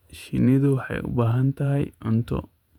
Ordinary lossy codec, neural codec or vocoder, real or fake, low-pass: none; vocoder, 48 kHz, 128 mel bands, Vocos; fake; 19.8 kHz